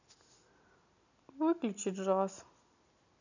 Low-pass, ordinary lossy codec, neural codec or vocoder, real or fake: 7.2 kHz; none; none; real